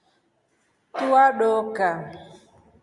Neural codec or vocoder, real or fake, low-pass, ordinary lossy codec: none; real; 10.8 kHz; Opus, 64 kbps